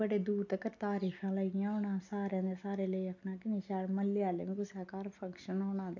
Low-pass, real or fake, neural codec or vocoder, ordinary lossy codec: 7.2 kHz; real; none; none